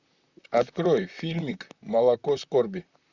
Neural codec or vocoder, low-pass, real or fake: vocoder, 44.1 kHz, 128 mel bands, Pupu-Vocoder; 7.2 kHz; fake